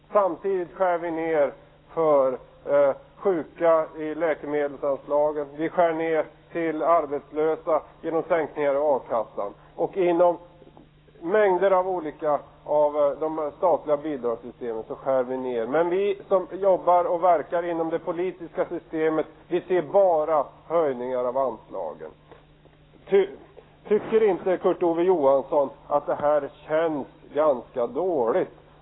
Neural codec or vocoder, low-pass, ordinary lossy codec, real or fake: autoencoder, 48 kHz, 128 numbers a frame, DAC-VAE, trained on Japanese speech; 7.2 kHz; AAC, 16 kbps; fake